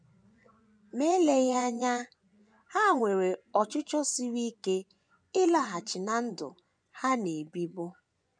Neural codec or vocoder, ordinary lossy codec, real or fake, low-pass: vocoder, 24 kHz, 100 mel bands, Vocos; none; fake; 9.9 kHz